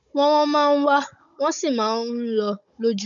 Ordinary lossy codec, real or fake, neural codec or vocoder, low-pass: MP3, 64 kbps; fake; codec, 16 kHz, 16 kbps, FunCodec, trained on Chinese and English, 50 frames a second; 7.2 kHz